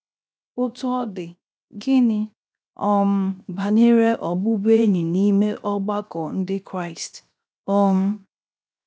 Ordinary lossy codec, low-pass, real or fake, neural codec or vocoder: none; none; fake; codec, 16 kHz, 0.7 kbps, FocalCodec